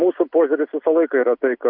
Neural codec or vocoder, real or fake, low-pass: none; real; 5.4 kHz